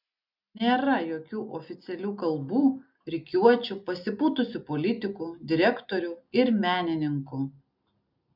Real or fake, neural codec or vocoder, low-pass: real; none; 5.4 kHz